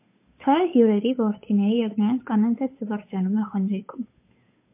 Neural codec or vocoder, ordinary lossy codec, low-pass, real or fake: codec, 16 kHz, 8 kbps, FunCodec, trained on Chinese and English, 25 frames a second; MP3, 24 kbps; 3.6 kHz; fake